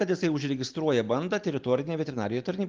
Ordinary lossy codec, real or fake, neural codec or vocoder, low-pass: Opus, 32 kbps; real; none; 7.2 kHz